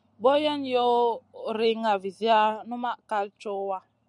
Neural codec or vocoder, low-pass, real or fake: none; 10.8 kHz; real